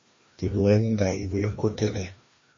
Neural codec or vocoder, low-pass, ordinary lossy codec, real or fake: codec, 16 kHz, 1 kbps, FreqCodec, larger model; 7.2 kHz; MP3, 32 kbps; fake